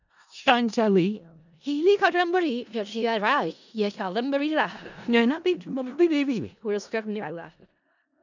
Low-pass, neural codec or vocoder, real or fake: 7.2 kHz; codec, 16 kHz in and 24 kHz out, 0.4 kbps, LongCat-Audio-Codec, four codebook decoder; fake